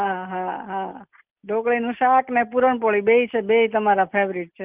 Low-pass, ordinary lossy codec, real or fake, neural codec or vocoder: 3.6 kHz; Opus, 24 kbps; real; none